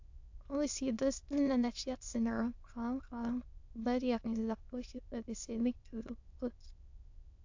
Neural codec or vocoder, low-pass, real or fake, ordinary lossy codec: autoencoder, 22.05 kHz, a latent of 192 numbers a frame, VITS, trained on many speakers; 7.2 kHz; fake; AAC, 48 kbps